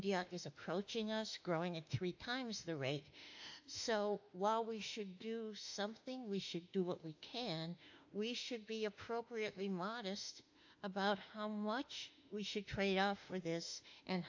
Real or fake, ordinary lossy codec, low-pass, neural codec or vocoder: fake; AAC, 48 kbps; 7.2 kHz; autoencoder, 48 kHz, 32 numbers a frame, DAC-VAE, trained on Japanese speech